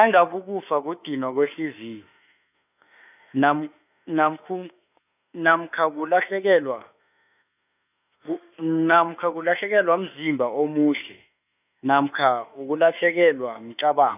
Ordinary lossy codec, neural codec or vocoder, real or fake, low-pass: none; autoencoder, 48 kHz, 32 numbers a frame, DAC-VAE, trained on Japanese speech; fake; 3.6 kHz